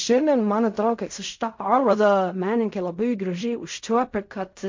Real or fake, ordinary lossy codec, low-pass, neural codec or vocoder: fake; MP3, 48 kbps; 7.2 kHz; codec, 16 kHz in and 24 kHz out, 0.4 kbps, LongCat-Audio-Codec, fine tuned four codebook decoder